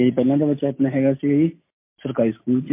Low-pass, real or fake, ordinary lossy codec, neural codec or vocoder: 3.6 kHz; real; MP3, 24 kbps; none